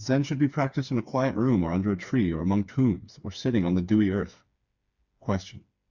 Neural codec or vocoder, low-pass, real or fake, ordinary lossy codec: codec, 16 kHz, 4 kbps, FreqCodec, smaller model; 7.2 kHz; fake; Opus, 64 kbps